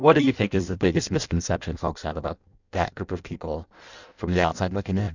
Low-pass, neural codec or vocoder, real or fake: 7.2 kHz; codec, 16 kHz in and 24 kHz out, 0.6 kbps, FireRedTTS-2 codec; fake